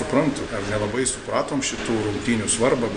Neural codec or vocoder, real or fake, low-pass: none; real; 9.9 kHz